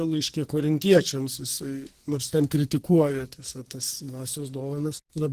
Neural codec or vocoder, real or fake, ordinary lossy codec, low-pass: codec, 44.1 kHz, 2.6 kbps, SNAC; fake; Opus, 16 kbps; 14.4 kHz